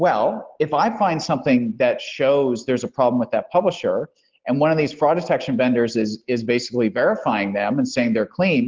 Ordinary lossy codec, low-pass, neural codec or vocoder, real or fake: Opus, 16 kbps; 7.2 kHz; none; real